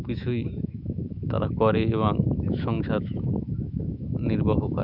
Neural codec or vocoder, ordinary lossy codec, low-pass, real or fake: autoencoder, 48 kHz, 128 numbers a frame, DAC-VAE, trained on Japanese speech; none; 5.4 kHz; fake